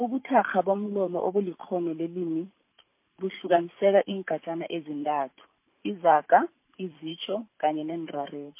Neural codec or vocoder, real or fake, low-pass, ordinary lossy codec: vocoder, 44.1 kHz, 128 mel bands every 512 samples, BigVGAN v2; fake; 3.6 kHz; MP3, 24 kbps